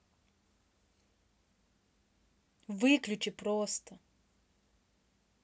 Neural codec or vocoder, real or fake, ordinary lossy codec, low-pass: none; real; none; none